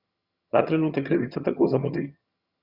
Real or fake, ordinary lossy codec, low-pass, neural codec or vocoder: fake; Opus, 64 kbps; 5.4 kHz; vocoder, 22.05 kHz, 80 mel bands, HiFi-GAN